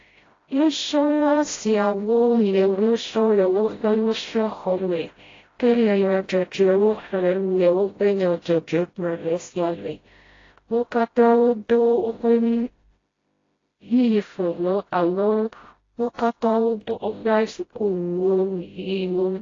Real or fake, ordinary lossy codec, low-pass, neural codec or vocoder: fake; AAC, 32 kbps; 7.2 kHz; codec, 16 kHz, 0.5 kbps, FreqCodec, smaller model